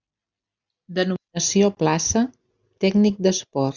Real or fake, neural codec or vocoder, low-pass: real; none; 7.2 kHz